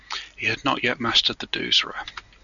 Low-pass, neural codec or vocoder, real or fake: 7.2 kHz; none; real